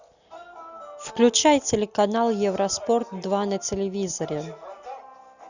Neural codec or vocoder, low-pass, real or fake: none; 7.2 kHz; real